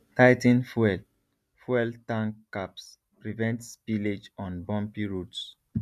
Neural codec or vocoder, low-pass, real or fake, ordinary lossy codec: none; 14.4 kHz; real; none